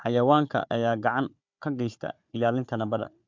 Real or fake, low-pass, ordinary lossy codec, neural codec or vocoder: fake; 7.2 kHz; MP3, 64 kbps; codec, 16 kHz, 4 kbps, FunCodec, trained on Chinese and English, 50 frames a second